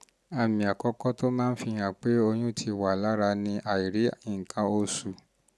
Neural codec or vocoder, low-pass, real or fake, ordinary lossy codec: none; none; real; none